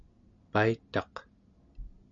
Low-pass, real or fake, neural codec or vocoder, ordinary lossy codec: 7.2 kHz; real; none; MP3, 64 kbps